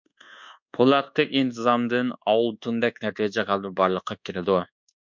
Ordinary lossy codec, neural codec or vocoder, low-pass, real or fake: MP3, 64 kbps; codec, 24 kHz, 1.2 kbps, DualCodec; 7.2 kHz; fake